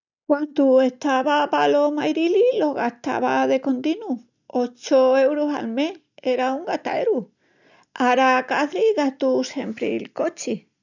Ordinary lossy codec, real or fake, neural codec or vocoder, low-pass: none; real; none; 7.2 kHz